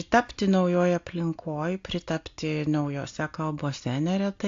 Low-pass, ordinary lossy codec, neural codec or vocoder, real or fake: 7.2 kHz; AAC, 48 kbps; none; real